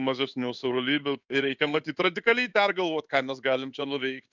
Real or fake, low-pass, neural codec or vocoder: fake; 7.2 kHz; codec, 16 kHz in and 24 kHz out, 1 kbps, XY-Tokenizer